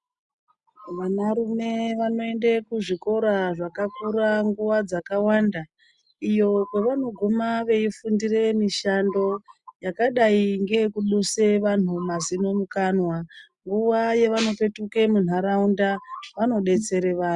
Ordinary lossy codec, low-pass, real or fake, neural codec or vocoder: Opus, 64 kbps; 10.8 kHz; real; none